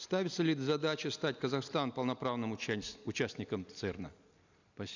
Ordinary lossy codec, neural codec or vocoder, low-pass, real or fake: none; none; 7.2 kHz; real